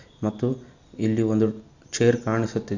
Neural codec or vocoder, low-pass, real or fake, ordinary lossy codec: none; 7.2 kHz; real; none